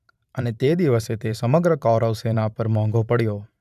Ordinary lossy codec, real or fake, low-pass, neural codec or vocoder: none; fake; 14.4 kHz; vocoder, 44.1 kHz, 128 mel bands every 512 samples, BigVGAN v2